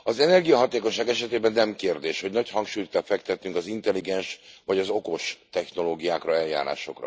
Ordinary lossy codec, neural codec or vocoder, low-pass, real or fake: none; none; none; real